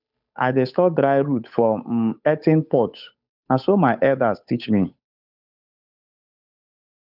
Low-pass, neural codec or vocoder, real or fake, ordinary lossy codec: 5.4 kHz; codec, 16 kHz, 8 kbps, FunCodec, trained on Chinese and English, 25 frames a second; fake; none